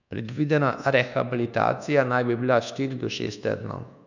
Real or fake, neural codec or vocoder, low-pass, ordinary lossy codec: fake; codec, 24 kHz, 1.2 kbps, DualCodec; 7.2 kHz; none